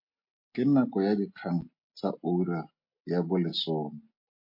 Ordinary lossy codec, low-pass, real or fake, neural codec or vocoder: MP3, 32 kbps; 5.4 kHz; real; none